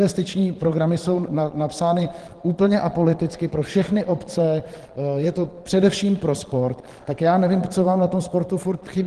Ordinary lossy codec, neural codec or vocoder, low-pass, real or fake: Opus, 16 kbps; vocoder, 22.05 kHz, 80 mel bands, Vocos; 9.9 kHz; fake